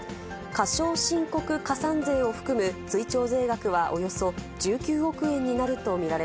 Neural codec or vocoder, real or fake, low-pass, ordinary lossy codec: none; real; none; none